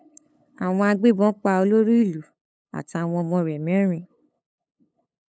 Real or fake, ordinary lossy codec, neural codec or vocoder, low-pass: fake; none; codec, 16 kHz, 8 kbps, FunCodec, trained on LibriTTS, 25 frames a second; none